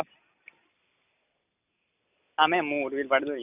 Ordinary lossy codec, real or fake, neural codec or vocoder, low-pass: none; real; none; 3.6 kHz